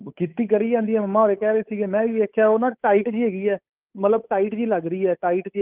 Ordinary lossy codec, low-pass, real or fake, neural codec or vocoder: Opus, 24 kbps; 3.6 kHz; fake; codec, 16 kHz, 8 kbps, FunCodec, trained on Chinese and English, 25 frames a second